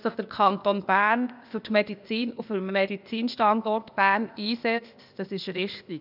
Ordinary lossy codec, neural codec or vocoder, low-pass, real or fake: none; codec, 16 kHz, 0.8 kbps, ZipCodec; 5.4 kHz; fake